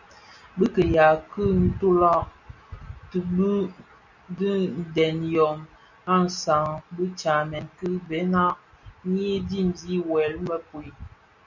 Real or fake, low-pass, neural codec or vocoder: real; 7.2 kHz; none